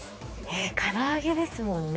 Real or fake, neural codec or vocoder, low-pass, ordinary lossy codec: fake; codec, 16 kHz, 4 kbps, X-Codec, HuBERT features, trained on general audio; none; none